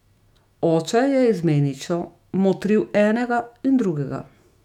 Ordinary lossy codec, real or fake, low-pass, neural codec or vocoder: none; fake; 19.8 kHz; autoencoder, 48 kHz, 128 numbers a frame, DAC-VAE, trained on Japanese speech